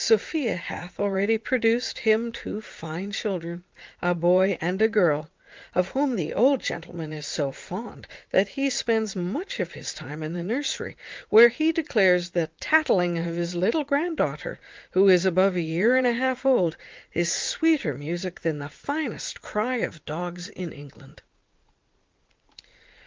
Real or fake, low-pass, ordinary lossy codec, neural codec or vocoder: fake; 7.2 kHz; Opus, 32 kbps; vocoder, 44.1 kHz, 80 mel bands, Vocos